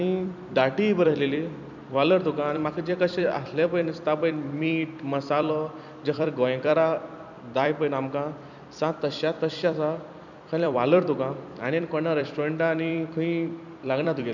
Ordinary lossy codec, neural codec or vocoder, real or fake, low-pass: none; vocoder, 44.1 kHz, 128 mel bands every 256 samples, BigVGAN v2; fake; 7.2 kHz